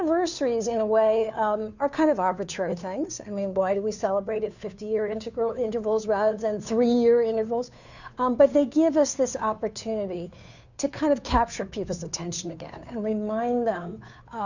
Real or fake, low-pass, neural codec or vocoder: fake; 7.2 kHz; codec, 16 kHz, 2 kbps, FunCodec, trained on Chinese and English, 25 frames a second